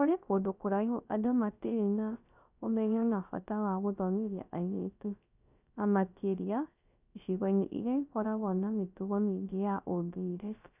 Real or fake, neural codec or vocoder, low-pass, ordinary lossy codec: fake; codec, 16 kHz, 0.3 kbps, FocalCodec; 3.6 kHz; none